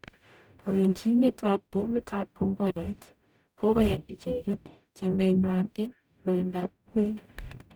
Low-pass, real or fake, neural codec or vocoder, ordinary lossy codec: none; fake; codec, 44.1 kHz, 0.9 kbps, DAC; none